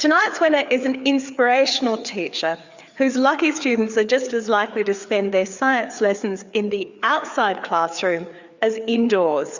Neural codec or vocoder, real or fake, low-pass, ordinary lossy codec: codec, 16 kHz, 4 kbps, FreqCodec, larger model; fake; 7.2 kHz; Opus, 64 kbps